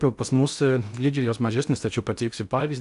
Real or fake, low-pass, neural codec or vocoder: fake; 10.8 kHz; codec, 16 kHz in and 24 kHz out, 0.6 kbps, FocalCodec, streaming, 2048 codes